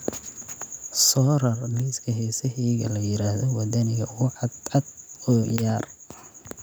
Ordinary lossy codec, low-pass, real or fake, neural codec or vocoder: none; none; real; none